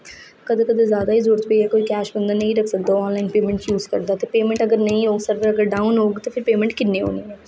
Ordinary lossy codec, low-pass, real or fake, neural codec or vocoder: none; none; real; none